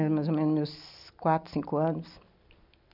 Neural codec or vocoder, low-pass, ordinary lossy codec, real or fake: none; 5.4 kHz; none; real